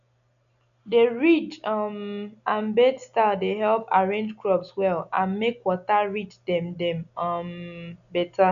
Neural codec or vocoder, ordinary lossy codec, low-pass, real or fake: none; none; 7.2 kHz; real